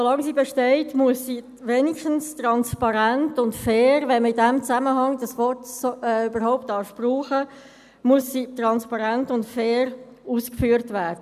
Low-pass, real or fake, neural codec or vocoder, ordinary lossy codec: 14.4 kHz; real; none; none